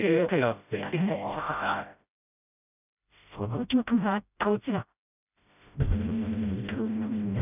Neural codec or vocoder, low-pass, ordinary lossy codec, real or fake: codec, 16 kHz, 0.5 kbps, FreqCodec, smaller model; 3.6 kHz; none; fake